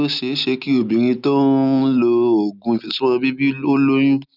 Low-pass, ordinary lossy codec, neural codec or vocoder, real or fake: 5.4 kHz; none; none; real